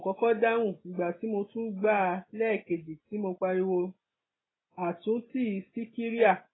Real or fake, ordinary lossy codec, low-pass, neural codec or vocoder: real; AAC, 16 kbps; 7.2 kHz; none